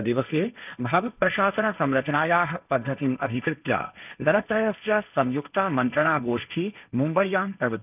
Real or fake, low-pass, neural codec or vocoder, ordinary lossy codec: fake; 3.6 kHz; codec, 16 kHz, 1.1 kbps, Voila-Tokenizer; none